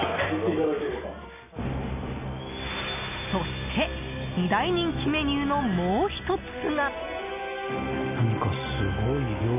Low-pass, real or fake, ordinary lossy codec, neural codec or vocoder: 3.6 kHz; real; none; none